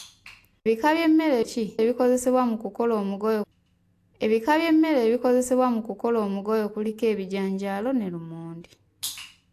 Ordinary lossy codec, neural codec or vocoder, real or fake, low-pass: AAC, 64 kbps; none; real; 14.4 kHz